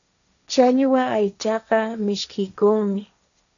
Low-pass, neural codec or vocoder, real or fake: 7.2 kHz; codec, 16 kHz, 1.1 kbps, Voila-Tokenizer; fake